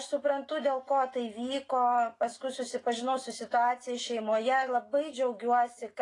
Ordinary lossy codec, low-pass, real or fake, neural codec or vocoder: AAC, 32 kbps; 10.8 kHz; fake; autoencoder, 48 kHz, 128 numbers a frame, DAC-VAE, trained on Japanese speech